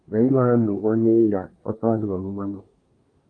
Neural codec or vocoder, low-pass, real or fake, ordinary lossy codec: codec, 24 kHz, 1 kbps, SNAC; 9.9 kHz; fake; Opus, 32 kbps